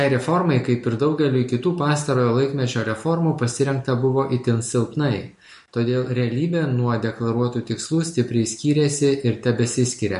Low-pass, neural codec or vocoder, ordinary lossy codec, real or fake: 14.4 kHz; none; MP3, 48 kbps; real